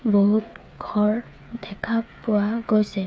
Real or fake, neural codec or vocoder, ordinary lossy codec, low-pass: fake; codec, 16 kHz, 8 kbps, FreqCodec, smaller model; none; none